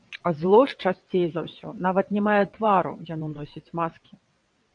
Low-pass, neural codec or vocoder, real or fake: 9.9 kHz; vocoder, 22.05 kHz, 80 mel bands, WaveNeXt; fake